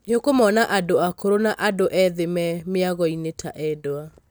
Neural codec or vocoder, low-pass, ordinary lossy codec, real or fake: none; none; none; real